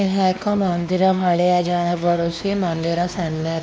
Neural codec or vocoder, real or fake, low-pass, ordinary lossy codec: codec, 16 kHz, 2 kbps, X-Codec, WavLM features, trained on Multilingual LibriSpeech; fake; none; none